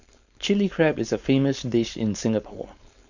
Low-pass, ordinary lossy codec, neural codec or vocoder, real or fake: 7.2 kHz; none; codec, 16 kHz, 4.8 kbps, FACodec; fake